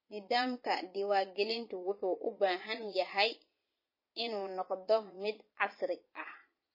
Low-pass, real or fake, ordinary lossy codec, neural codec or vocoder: 5.4 kHz; fake; MP3, 24 kbps; vocoder, 22.05 kHz, 80 mel bands, Vocos